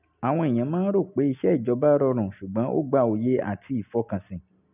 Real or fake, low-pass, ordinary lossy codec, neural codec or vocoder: real; 3.6 kHz; none; none